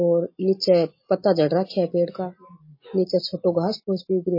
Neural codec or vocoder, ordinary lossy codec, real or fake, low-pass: none; MP3, 24 kbps; real; 5.4 kHz